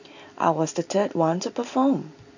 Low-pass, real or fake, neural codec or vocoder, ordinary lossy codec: 7.2 kHz; real; none; none